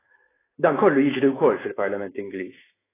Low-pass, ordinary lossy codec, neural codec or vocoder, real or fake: 3.6 kHz; AAC, 16 kbps; autoencoder, 48 kHz, 128 numbers a frame, DAC-VAE, trained on Japanese speech; fake